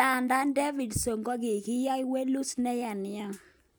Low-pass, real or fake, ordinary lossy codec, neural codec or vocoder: none; real; none; none